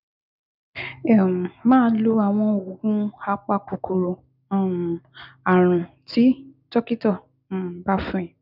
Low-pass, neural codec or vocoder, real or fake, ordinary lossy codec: 5.4 kHz; none; real; none